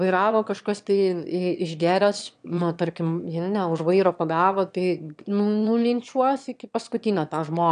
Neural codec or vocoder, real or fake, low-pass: autoencoder, 22.05 kHz, a latent of 192 numbers a frame, VITS, trained on one speaker; fake; 9.9 kHz